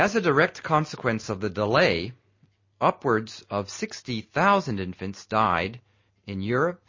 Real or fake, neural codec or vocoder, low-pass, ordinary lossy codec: real; none; 7.2 kHz; MP3, 32 kbps